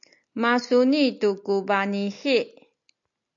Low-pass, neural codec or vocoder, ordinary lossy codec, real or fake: 7.2 kHz; none; AAC, 64 kbps; real